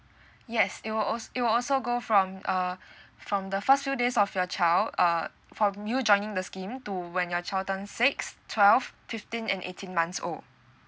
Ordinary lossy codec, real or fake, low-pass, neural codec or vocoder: none; real; none; none